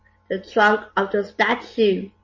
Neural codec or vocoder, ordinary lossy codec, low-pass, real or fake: none; MP3, 32 kbps; 7.2 kHz; real